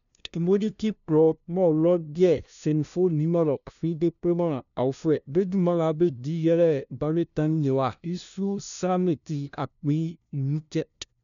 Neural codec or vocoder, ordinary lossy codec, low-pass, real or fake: codec, 16 kHz, 0.5 kbps, FunCodec, trained on LibriTTS, 25 frames a second; none; 7.2 kHz; fake